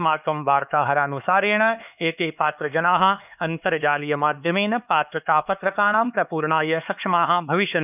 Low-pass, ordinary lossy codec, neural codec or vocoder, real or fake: 3.6 kHz; none; codec, 16 kHz, 4 kbps, X-Codec, HuBERT features, trained on LibriSpeech; fake